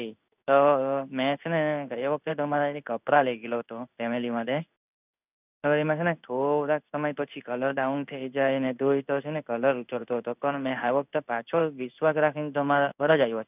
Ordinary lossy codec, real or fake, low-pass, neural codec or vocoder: none; fake; 3.6 kHz; codec, 16 kHz in and 24 kHz out, 1 kbps, XY-Tokenizer